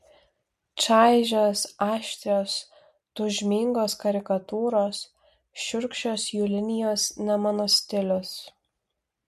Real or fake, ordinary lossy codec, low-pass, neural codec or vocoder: real; MP3, 64 kbps; 14.4 kHz; none